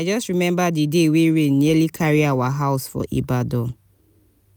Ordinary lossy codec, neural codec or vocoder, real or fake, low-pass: none; none; real; none